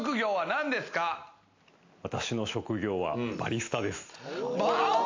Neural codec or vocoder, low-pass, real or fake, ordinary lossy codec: none; 7.2 kHz; real; MP3, 64 kbps